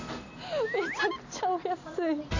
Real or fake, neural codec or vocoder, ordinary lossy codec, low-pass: real; none; AAC, 48 kbps; 7.2 kHz